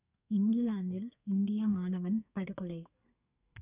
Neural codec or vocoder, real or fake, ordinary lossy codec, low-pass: codec, 32 kHz, 1.9 kbps, SNAC; fake; none; 3.6 kHz